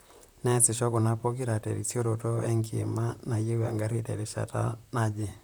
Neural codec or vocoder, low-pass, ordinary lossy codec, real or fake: vocoder, 44.1 kHz, 128 mel bands, Pupu-Vocoder; none; none; fake